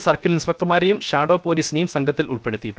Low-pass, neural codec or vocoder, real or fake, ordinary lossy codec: none; codec, 16 kHz, 0.7 kbps, FocalCodec; fake; none